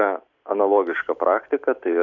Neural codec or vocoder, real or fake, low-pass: none; real; 7.2 kHz